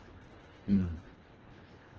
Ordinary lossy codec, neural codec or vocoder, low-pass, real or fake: Opus, 16 kbps; codec, 24 kHz, 1.5 kbps, HILCodec; 7.2 kHz; fake